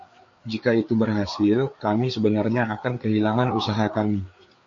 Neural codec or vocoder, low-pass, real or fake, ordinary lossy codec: codec, 16 kHz, 4 kbps, FreqCodec, larger model; 7.2 kHz; fake; MP3, 48 kbps